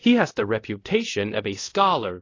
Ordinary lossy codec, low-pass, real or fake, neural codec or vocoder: AAC, 32 kbps; 7.2 kHz; fake; codec, 24 kHz, 0.5 kbps, DualCodec